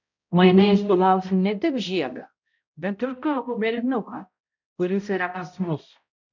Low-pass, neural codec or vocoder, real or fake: 7.2 kHz; codec, 16 kHz, 0.5 kbps, X-Codec, HuBERT features, trained on balanced general audio; fake